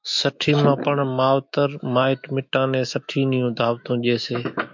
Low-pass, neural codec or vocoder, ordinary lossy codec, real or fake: 7.2 kHz; autoencoder, 48 kHz, 128 numbers a frame, DAC-VAE, trained on Japanese speech; MP3, 64 kbps; fake